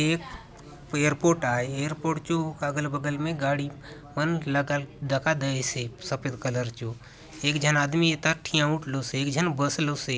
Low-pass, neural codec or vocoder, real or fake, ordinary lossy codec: none; none; real; none